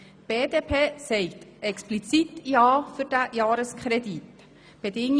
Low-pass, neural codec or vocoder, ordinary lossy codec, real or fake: 9.9 kHz; none; none; real